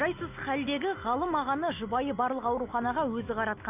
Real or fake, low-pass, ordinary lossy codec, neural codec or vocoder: real; 3.6 kHz; none; none